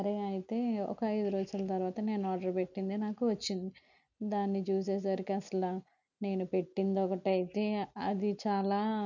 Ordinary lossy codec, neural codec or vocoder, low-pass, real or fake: none; none; 7.2 kHz; real